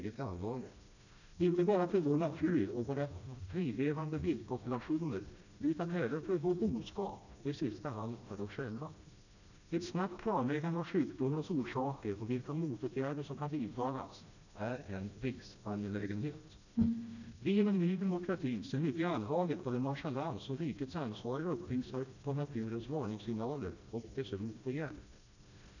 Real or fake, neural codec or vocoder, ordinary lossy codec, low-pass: fake; codec, 16 kHz, 1 kbps, FreqCodec, smaller model; none; 7.2 kHz